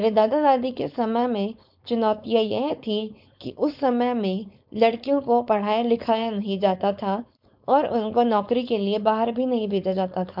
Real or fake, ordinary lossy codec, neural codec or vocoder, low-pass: fake; MP3, 48 kbps; codec, 16 kHz, 4.8 kbps, FACodec; 5.4 kHz